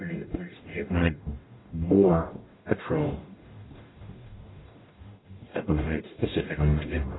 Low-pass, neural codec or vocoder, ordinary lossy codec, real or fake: 7.2 kHz; codec, 44.1 kHz, 0.9 kbps, DAC; AAC, 16 kbps; fake